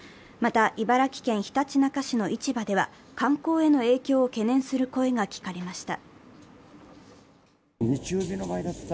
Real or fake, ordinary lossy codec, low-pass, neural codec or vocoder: real; none; none; none